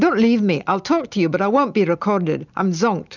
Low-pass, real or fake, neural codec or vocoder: 7.2 kHz; real; none